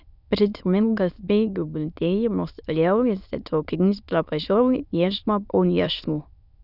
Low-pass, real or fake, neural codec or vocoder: 5.4 kHz; fake; autoencoder, 22.05 kHz, a latent of 192 numbers a frame, VITS, trained on many speakers